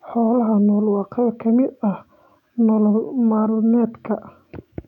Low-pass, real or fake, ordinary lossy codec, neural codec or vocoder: 19.8 kHz; fake; none; autoencoder, 48 kHz, 128 numbers a frame, DAC-VAE, trained on Japanese speech